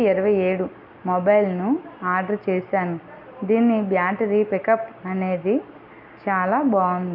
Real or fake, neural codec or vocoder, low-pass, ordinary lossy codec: real; none; 5.4 kHz; none